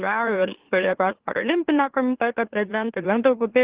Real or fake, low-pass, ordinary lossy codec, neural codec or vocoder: fake; 3.6 kHz; Opus, 16 kbps; autoencoder, 44.1 kHz, a latent of 192 numbers a frame, MeloTTS